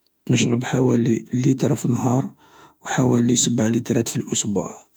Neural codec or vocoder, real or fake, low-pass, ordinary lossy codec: autoencoder, 48 kHz, 32 numbers a frame, DAC-VAE, trained on Japanese speech; fake; none; none